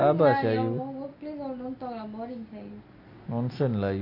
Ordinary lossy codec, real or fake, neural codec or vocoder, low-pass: none; real; none; 5.4 kHz